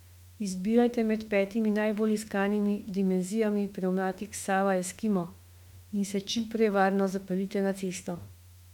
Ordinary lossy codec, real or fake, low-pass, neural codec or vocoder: MP3, 96 kbps; fake; 19.8 kHz; autoencoder, 48 kHz, 32 numbers a frame, DAC-VAE, trained on Japanese speech